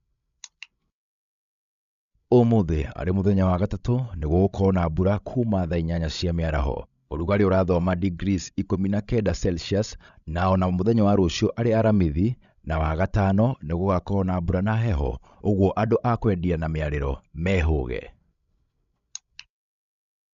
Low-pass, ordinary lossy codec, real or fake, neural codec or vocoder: 7.2 kHz; none; fake; codec, 16 kHz, 16 kbps, FreqCodec, larger model